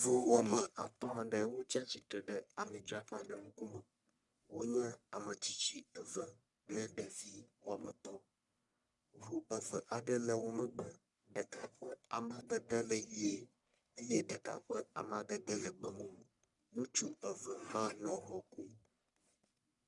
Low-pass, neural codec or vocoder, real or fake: 10.8 kHz; codec, 44.1 kHz, 1.7 kbps, Pupu-Codec; fake